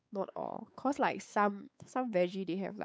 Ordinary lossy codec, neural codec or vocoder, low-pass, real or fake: none; codec, 16 kHz, 4 kbps, X-Codec, WavLM features, trained on Multilingual LibriSpeech; none; fake